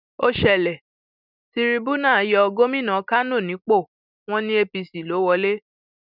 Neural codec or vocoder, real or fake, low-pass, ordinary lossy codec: none; real; 5.4 kHz; none